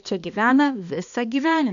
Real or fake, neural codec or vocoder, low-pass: fake; codec, 16 kHz, 2 kbps, X-Codec, HuBERT features, trained on balanced general audio; 7.2 kHz